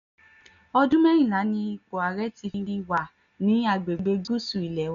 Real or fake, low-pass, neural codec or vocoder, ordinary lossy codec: real; 7.2 kHz; none; MP3, 96 kbps